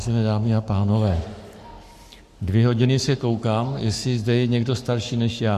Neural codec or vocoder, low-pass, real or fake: codec, 44.1 kHz, 7.8 kbps, Pupu-Codec; 14.4 kHz; fake